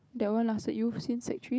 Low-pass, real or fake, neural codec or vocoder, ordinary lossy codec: none; fake; codec, 16 kHz, 4 kbps, FunCodec, trained on Chinese and English, 50 frames a second; none